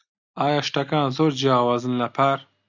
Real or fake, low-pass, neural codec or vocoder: real; 7.2 kHz; none